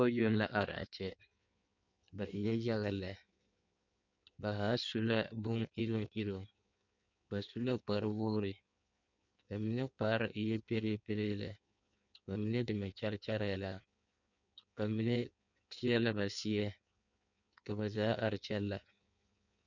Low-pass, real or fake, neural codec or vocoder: 7.2 kHz; fake; codec, 16 kHz in and 24 kHz out, 1.1 kbps, FireRedTTS-2 codec